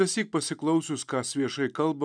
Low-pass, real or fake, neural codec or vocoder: 9.9 kHz; real; none